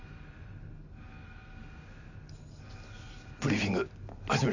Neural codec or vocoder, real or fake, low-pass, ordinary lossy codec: none; real; 7.2 kHz; none